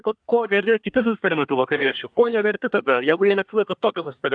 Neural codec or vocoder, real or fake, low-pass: codec, 24 kHz, 1 kbps, SNAC; fake; 9.9 kHz